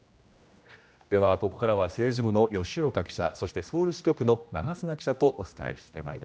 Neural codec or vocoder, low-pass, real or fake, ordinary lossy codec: codec, 16 kHz, 1 kbps, X-Codec, HuBERT features, trained on general audio; none; fake; none